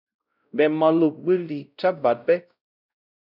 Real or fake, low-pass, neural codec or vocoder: fake; 5.4 kHz; codec, 16 kHz, 0.5 kbps, X-Codec, WavLM features, trained on Multilingual LibriSpeech